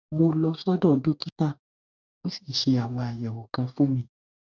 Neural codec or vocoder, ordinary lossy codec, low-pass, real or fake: codec, 44.1 kHz, 2.6 kbps, DAC; none; 7.2 kHz; fake